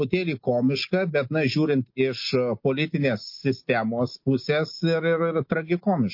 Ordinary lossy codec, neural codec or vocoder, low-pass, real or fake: MP3, 32 kbps; none; 5.4 kHz; real